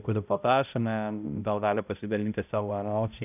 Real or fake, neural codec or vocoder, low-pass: fake; codec, 16 kHz, 0.5 kbps, X-Codec, HuBERT features, trained on balanced general audio; 3.6 kHz